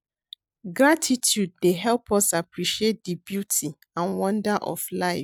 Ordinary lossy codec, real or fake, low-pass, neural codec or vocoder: none; real; none; none